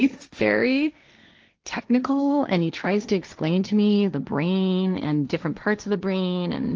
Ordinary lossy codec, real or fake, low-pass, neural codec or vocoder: Opus, 24 kbps; fake; 7.2 kHz; codec, 16 kHz, 1.1 kbps, Voila-Tokenizer